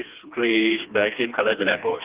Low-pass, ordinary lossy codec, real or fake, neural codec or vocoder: 3.6 kHz; Opus, 32 kbps; fake; codec, 16 kHz, 2 kbps, FreqCodec, smaller model